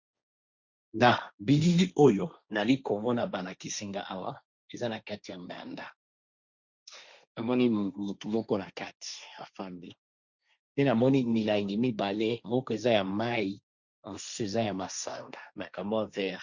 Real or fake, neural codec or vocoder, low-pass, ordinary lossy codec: fake; codec, 16 kHz, 1.1 kbps, Voila-Tokenizer; 7.2 kHz; Opus, 64 kbps